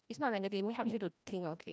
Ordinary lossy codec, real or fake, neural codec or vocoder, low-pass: none; fake; codec, 16 kHz, 1 kbps, FreqCodec, larger model; none